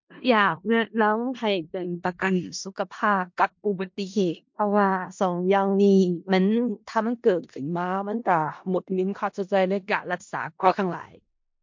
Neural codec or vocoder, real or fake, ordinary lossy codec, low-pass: codec, 16 kHz in and 24 kHz out, 0.4 kbps, LongCat-Audio-Codec, four codebook decoder; fake; MP3, 48 kbps; 7.2 kHz